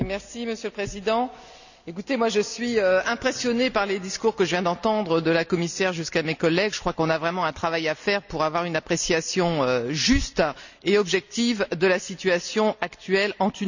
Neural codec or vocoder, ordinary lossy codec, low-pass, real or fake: none; none; 7.2 kHz; real